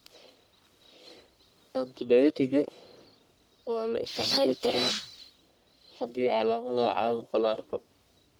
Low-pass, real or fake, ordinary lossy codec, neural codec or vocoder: none; fake; none; codec, 44.1 kHz, 1.7 kbps, Pupu-Codec